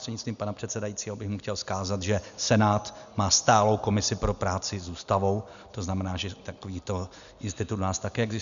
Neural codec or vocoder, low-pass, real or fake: none; 7.2 kHz; real